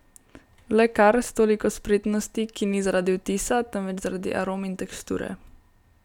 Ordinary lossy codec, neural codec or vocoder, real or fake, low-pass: none; none; real; 19.8 kHz